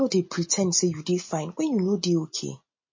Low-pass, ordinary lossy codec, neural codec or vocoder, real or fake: 7.2 kHz; MP3, 32 kbps; none; real